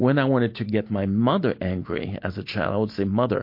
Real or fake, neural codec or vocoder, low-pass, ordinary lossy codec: real; none; 5.4 kHz; MP3, 32 kbps